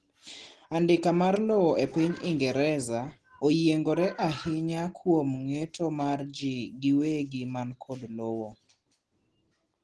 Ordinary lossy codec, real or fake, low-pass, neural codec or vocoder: Opus, 16 kbps; real; 10.8 kHz; none